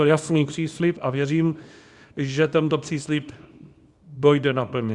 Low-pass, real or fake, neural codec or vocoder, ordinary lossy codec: 10.8 kHz; fake; codec, 24 kHz, 0.9 kbps, WavTokenizer, small release; Opus, 64 kbps